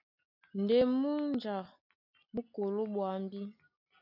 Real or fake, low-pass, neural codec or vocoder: real; 5.4 kHz; none